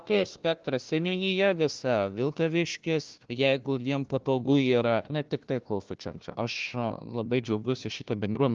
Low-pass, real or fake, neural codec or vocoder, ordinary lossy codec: 7.2 kHz; fake; codec, 16 kHz, 1 kbps, FunCodec, trained on Chinese and English, 50 frames a second; Opus, 32 kbps